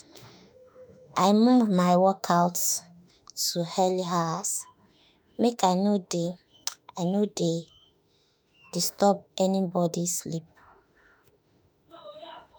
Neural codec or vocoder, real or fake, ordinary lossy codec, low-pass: autoencoder, 48 kHz, 32 numbers a frame, DAC-VAE, trained on Japanese speech; fake; none; none